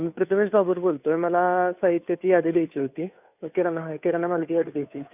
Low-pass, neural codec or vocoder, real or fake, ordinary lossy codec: 3.6 kHz; codec, 16 kHz, 2 kbps, FunCodec, trained on Chinese and English, 25 frames a second; fake; none